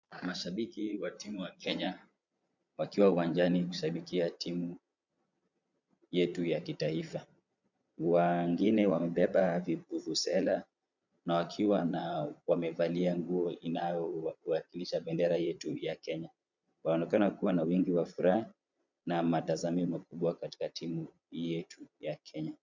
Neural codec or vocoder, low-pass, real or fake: vocoder, 22.05 kHz, 80 mel bands, Vocos; 7.2 kHz; fake